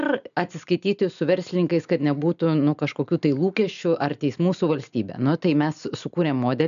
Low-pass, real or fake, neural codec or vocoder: 7.2 kHz; real; none